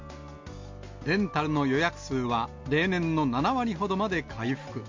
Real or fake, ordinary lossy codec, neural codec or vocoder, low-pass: real; MP3, 48 kbps; none; 7.2 kHz